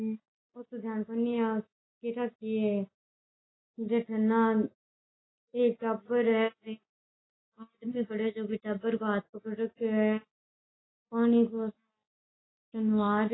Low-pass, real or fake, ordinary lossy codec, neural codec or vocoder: 7.2 kHz; real; AAC, 16 kbps; none